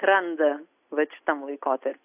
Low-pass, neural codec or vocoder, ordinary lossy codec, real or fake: 3.6 kHz; none; AAC, 32 kbps; real